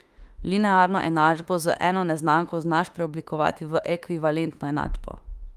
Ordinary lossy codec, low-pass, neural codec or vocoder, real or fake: Opus, 32 kbps; 14.4 kHz; autoencoder, 48 kHz, 32 numbers a frame, DAC-VAE, trained on Japanese speech; fake